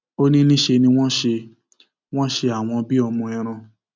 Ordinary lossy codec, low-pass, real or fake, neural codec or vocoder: none; none; real; none